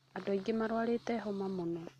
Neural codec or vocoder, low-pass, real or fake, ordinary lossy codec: none; 14.4 kHz; real; none